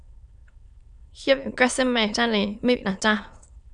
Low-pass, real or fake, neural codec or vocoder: 9.9 kHz; fake; autoencoder, 22.05 kHz, a latent of 192 numbers a frame, VITS, trained on many speakers